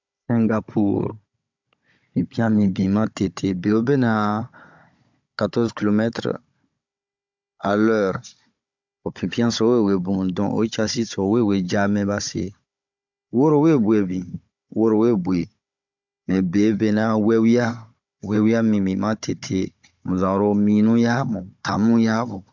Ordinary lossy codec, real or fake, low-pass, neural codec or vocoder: MP3, 64 kbps; fake; 7.2 kHz; codec, 16 kHz, 16 kbps, FunCodec, trained on Chinese and English, 50 frames a second